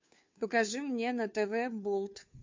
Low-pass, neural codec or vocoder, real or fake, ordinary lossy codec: 7.2 kHz; codec, 16 kHz, 2 kbps, FunCodec, trained on Chinese and English, 25 frames a second; fake; MP3, 48 kbps